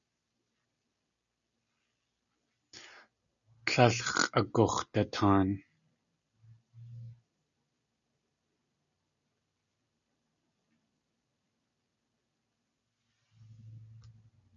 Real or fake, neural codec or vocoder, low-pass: real; none; 7.2 kHz